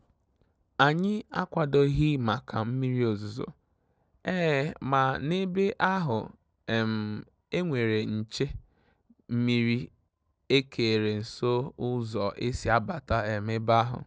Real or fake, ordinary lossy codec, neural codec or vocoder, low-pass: real; none; none; none